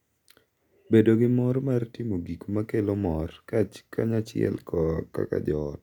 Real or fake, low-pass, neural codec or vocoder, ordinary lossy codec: real; 19.8 kHz; none; none